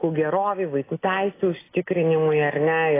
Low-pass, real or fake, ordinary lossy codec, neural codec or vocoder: 3.6 kHz; real; AAC, 16 kbps; none